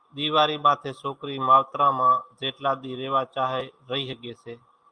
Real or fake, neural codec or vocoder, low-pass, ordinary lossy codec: real; none; 9.9 kHz; Opus, 32 kbps